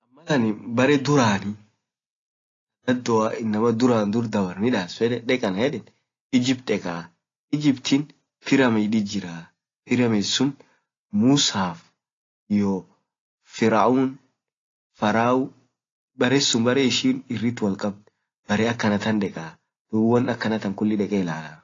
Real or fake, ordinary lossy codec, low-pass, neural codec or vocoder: real; AAC, 32 kbps; 7.2 kHz; none